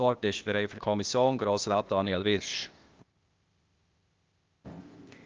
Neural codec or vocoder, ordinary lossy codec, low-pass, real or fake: codec, 16 kHz, 0.8 kbps, ZipCodec; Opus, 24 kbps; 7.2 kHz; fake